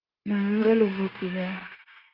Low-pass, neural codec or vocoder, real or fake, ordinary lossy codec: 5.4 kHz; codec, 16 kHz, 0.9 kbps, LongCat-Audio-Codec; fake; Opus, 32 kbps